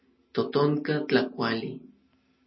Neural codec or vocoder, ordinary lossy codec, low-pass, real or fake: none; MP3, 24 kbps; 7.2 kHz; real